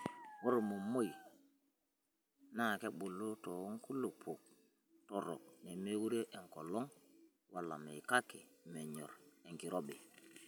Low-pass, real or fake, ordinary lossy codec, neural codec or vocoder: none; real; none; none